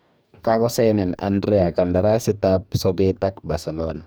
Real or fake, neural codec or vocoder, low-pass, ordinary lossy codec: fake; codec, 44.1 kHz, 2.6 kbps, DAC; none; none